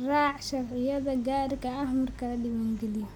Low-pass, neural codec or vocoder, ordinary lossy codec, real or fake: 19.8 kHz; none; none; real